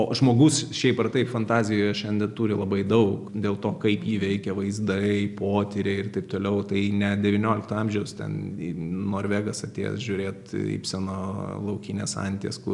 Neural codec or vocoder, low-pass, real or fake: none; 10.8 kHz; real